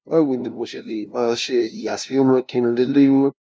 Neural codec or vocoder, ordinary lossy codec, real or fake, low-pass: codec, 16 kHz, 0.5 kbps, FunCodec, trained on LibriTTS, 25 frames a second; none; fake; none